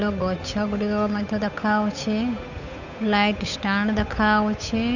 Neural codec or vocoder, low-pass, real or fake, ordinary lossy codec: codec, 16 kHz, 8 kbps, FunCodec, trained on Chinese and English, 25 frames a second; 7.2 kHz; fake; none